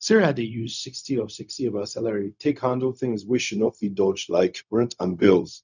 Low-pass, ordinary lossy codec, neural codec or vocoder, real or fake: 7.2 kHz; none; codec, 16 kHz, 0.4 kbps, LongCat-Audio-Codec; fake